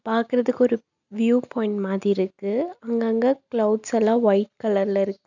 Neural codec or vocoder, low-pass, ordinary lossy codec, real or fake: none; 7.2 kHz; none; real